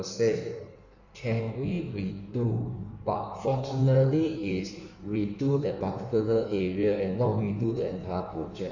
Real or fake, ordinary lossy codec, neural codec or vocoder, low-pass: fake; none; codec, 16 kHz in and 24 kHz out, 1.1 kbps, FireRedTTS-2 codec; 7.2 kHz